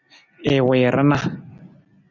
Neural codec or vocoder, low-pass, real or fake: none; 7.2 kHz; real